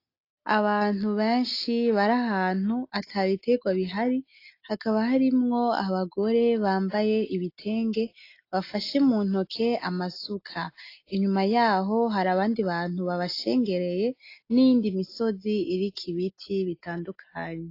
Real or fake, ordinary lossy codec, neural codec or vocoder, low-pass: real; AAC, 32 kbps; none; 5.4 kHz